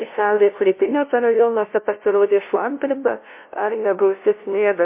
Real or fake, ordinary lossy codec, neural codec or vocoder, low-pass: fake; MP3, 24 kbps; codec, 16 kHz, 0.5 kbps, FunCodec, trained on LibriTTS, 25 frames a second; 3.6 kHz